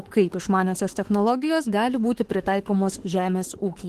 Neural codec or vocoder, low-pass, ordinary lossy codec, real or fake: autoencoder, 48 kHz, 32 numbers a frame, DAC-VAE, trained on Japanese speech; 14.4 kHz; Opus, 16 kbps; fake